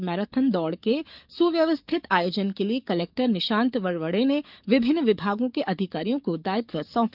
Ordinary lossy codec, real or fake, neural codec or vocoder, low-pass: none; fake; codec, 44.1 kHz, 7.8 kbps, DAC; 5.4 kHz